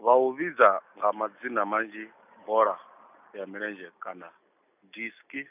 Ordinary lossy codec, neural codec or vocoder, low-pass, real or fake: none; none; 3.6 kHz; real